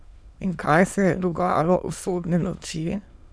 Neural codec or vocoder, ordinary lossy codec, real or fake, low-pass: autoencoder, 22.05 kHz, a latent of 192 numbers a frame, VITS, trained on many speakers; none; fake; none